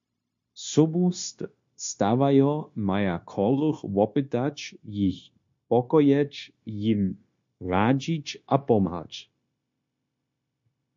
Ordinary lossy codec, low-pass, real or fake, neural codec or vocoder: MP3, 48 kbps; 7.2 kHz; fake; codec, 16 kHz, 0.9 kbps, LongCat-Audio-Codec